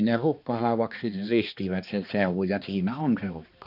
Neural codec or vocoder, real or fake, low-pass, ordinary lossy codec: codec, 16 kHz, 2 kbps, X-Codec, HuBERT features, trained on balanced general audio; fake; 5.4 kHz; none